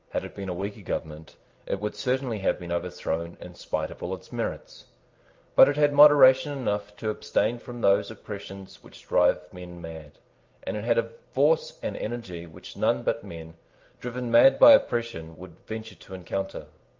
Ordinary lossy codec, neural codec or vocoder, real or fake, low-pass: Opus, 24 kbps; none; real; 7.2 kHz